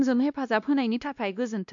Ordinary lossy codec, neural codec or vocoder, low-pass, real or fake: AAC, 64 kbps; codec, 16 kHz, 1 kbps, X-Codec, WavLM features, trained on Multilingual LibriSpeech; 7.2 kHz; fake